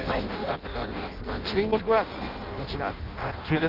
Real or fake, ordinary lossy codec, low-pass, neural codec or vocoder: fake; Opus, 24 kbps; 5.4 kHz; codec, 16 kHz in and 24 kHz out, 0.6 kbps, FireRedTTS-2 codec